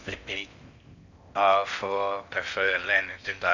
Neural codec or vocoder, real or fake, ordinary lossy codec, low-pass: codec, 16 kHz in and 24 kHz out, 0.6 kbps, FocalCodec, streaming, 4096 codes; fake; none; 7.2 kHz